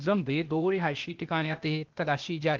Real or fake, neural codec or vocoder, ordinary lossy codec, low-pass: fake; codec, 16 kHz, 0.8 kbps, ZipCodec; Opus, 32 kbps; 7.2 kHz